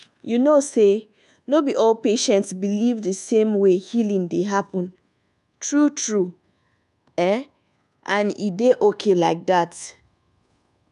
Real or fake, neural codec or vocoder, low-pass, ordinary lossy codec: fake; codec, 24 kHz, 1.2 kbps, DualCodec; 10.8 kHz; none